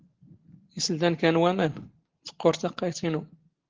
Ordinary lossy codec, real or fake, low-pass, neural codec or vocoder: Opus, 16 kbps; real; 7.2 kHz; none